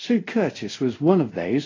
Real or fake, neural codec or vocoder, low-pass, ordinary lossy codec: fake; codec, 24 kHz, 0.5 kbps, DualCodec; 7.2 kHz; AAC, 32 kbps